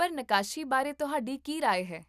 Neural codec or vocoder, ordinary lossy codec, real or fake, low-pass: none; none; real; 14.4 kHz